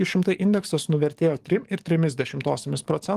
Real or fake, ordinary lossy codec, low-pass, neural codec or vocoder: fake; Opus, 24 kbps; 14.4 kHz; codec, 44.1 kHz, 7.8 kbps, DAC